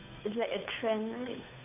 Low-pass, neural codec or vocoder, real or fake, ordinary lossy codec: 3.6 kHz; codec, 16 kHz, 2 kbps, FunCodec, trained on Chinese and English, 25 frames a second; fake; none